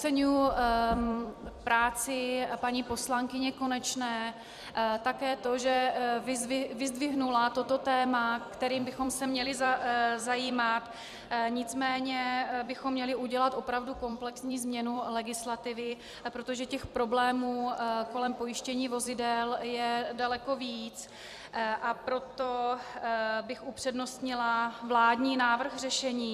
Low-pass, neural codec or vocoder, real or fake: 14.4 kHz; vocoder, 44.1 kHz, 128 mel bands every 256 samples, BigVGAN v2; fake